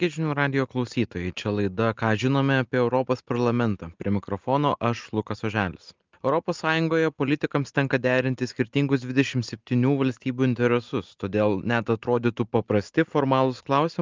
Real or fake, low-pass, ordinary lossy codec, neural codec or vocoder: real; 7.2 kHz; Opus, 24 kbps; none